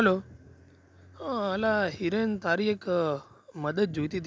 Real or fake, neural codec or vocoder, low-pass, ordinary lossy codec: real; none; none; none